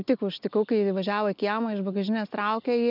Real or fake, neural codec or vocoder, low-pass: real; none; 5.4 kHz